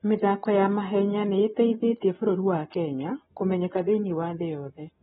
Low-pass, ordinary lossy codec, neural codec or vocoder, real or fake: 19.8 kHz; AAC, 16 kbps; none; real